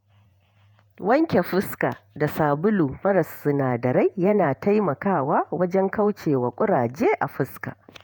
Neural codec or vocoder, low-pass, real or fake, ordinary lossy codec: vocoder, 48 kHz, 128 mel bands, Vocos; none; fake; none